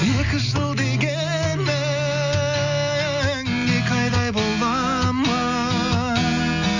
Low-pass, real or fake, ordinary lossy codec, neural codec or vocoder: 7.2 kHz; real; none; none